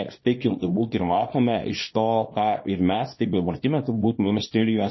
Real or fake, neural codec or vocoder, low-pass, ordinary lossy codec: fake; codec, 24 kHz, 0.9 kbps, WavTokenizer, small release; 7.2 kHz; MP3, 24 kbps